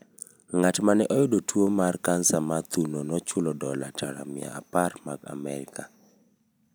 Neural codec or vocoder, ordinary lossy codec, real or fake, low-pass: none; none; real; none